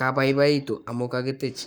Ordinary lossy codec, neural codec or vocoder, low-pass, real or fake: none; codec, 44.1 kHz, 7.8 kbps, Pupu-Codec; none; fake